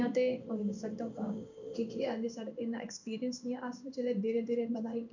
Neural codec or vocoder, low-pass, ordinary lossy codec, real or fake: codec, 16 kHz in and 24 kHz out, 1 kbps, XY-Tokenizer; 7.2 kHz; none; fake